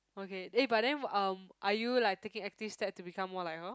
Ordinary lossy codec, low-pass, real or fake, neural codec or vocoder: none; none; real; none